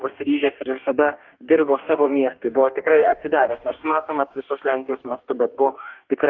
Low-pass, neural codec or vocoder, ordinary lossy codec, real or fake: 7.2 kHz; codec, 44.1 kHz, 2.6 kbps, DAC; Opus, 32 kbps; fake